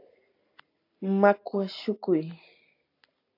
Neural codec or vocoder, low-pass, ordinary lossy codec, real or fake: none; 5.4 kHz; AAC, 32 kbps; real